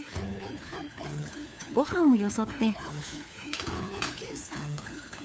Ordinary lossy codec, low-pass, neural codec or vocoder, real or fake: none; none; codec, 16 kHz, 4 kbps, FunCodec, trained on LibriTTS, 50 frames a second; fake